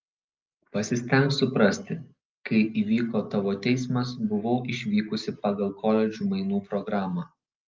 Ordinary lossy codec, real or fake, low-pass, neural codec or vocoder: Opus, 32 kbps; real; 7.2 kHz; none